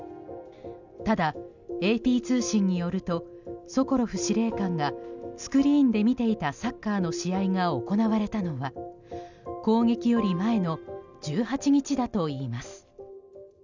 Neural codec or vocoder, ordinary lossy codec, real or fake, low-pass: none; none; real; 7.2 kHz